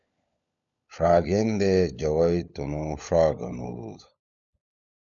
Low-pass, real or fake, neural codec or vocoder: 7.2 kHz; fake; codec, 16 kHz, 16 kbps, FunCodec, trained on LibriTTS, 50 frames a second